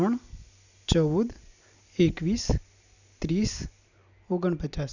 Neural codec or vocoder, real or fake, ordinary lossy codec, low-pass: none; real; none; 7.2 kHz